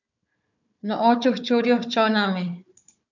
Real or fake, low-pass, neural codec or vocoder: fake; 7.2 kHz; codec, 16 kHz, 4 kbps, FunCodec, trained on Chinese and English, 50 frames a second